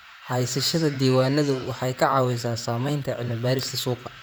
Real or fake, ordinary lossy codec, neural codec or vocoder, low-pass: fake; none; vocoder, 44.1 kHz, 128 mel bands, Pupu-Vocoder; none